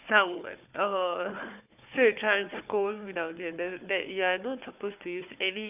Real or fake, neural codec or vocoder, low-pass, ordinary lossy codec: fake; codec, 16 kHz, 4 kbps, FunCodec, trained on Chinese and English, 50 frames a second; 3.6 kHz; none